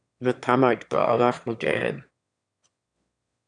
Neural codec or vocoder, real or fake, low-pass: autoencoder, 22.05 kHz, a latent of 192 numbers a frame, VITS, trained on one speaker; fake; 9.9 kHz